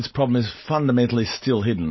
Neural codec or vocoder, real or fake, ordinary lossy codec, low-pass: none; real; MP3, 24 kbps; 7.2 kHz